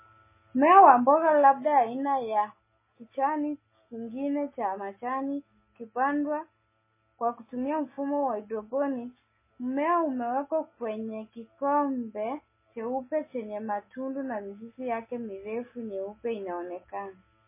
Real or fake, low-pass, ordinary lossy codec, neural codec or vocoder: real; 3.6 kHz; MP3, 16 kbps; none